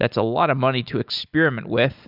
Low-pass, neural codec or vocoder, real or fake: 5.4 kHz; none; real